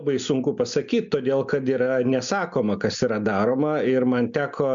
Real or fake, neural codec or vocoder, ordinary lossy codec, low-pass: real; none; MP3, 96 kbps; 7.2 kHz